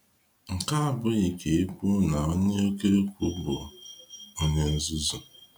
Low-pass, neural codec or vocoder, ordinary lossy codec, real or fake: 19.8 kHz; none; none; real